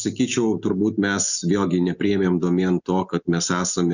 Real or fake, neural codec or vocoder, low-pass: real; none; 7.2 kHz